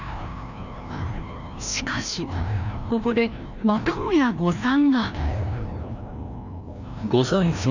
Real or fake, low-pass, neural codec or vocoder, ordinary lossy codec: fake; 7.2 kHz; codec, 16 kHz, 1 kbps, FreqCodec, larger model; none